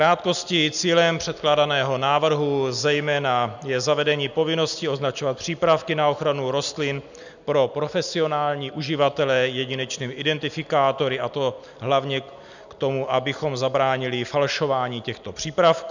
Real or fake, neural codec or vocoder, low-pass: real; none; 7.2 kHz